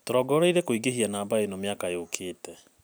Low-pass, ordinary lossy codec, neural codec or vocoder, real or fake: none; none; none; real